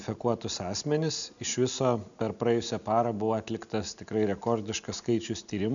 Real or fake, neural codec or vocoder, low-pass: real; none; 7.2 kHz